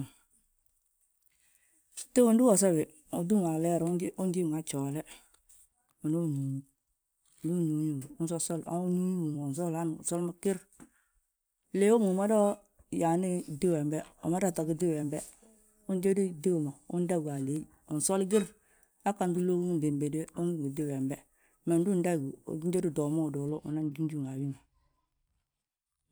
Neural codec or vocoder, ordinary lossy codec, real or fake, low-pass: vocoder, 44.1 kHz, 128 mel bands every 256 samples, BigVGAN v2; none; fake; none